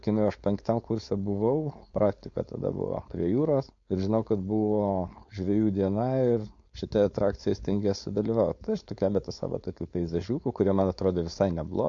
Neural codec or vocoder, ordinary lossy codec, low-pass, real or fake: codec, 16 kHz, 4.8 kbps, FACodec; MP3, 48 kbps; 7.2 kHz; fake